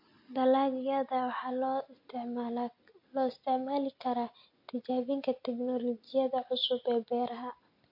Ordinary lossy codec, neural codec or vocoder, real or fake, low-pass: MP3, 32 kbps; none; real; 5.4 kHz